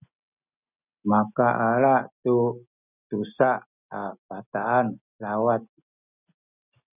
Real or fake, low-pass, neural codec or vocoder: real; 3.6 kHz; none